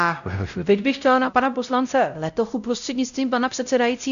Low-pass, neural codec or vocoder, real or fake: 7.2 kHz; codec, 16 kHz, 0.5 kbps, X-Codec, WavLM features, trained on Multilingual LibriSpeech; fake